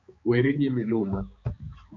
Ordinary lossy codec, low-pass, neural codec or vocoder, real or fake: MP3, 48 kbps; 7.2 kHz; codec, 16 kHz, 4 kbps, X-Codec, HuBERT features, trained on balanced general audio; fake